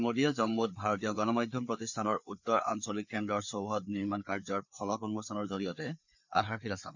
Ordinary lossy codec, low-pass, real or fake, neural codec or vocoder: none; 7.2 kHz; fake; codec, 16 kHz, 4 kbps, FreqCodec, larger model